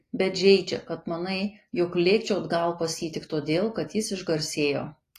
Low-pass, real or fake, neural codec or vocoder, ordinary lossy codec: 14.4 kHz; real; none; AAC, 48 kbps